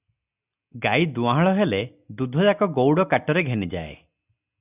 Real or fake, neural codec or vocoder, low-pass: real; none; 3.6 kHz